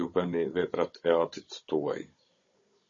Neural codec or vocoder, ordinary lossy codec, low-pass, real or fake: codec, 16 kHz, 16 kbps, FunCodec, trained on Chinese and English, 50 frames a second; MP3, 32 kbps; 7.2 kHz; fake